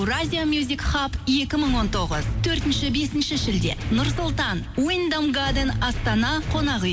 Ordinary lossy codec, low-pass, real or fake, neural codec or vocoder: none; none; real; none